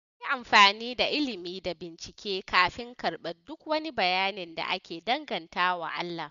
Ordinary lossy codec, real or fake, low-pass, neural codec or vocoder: none; real; 7.2 kHz; none